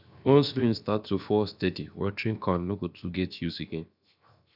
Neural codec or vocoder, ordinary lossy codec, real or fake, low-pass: codec, 16 kHz, 0.7 kbps, FocalCodec; none; fake; 5.4 kHz